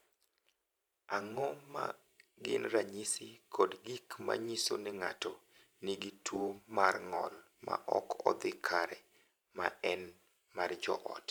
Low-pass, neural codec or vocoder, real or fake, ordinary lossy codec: none; vocoder, 44.1 kHz, 128 mel bands every 512 samples, BigVGAN v2; fake; none